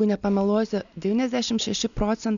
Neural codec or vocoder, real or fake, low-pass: none; real; 7.2 kHz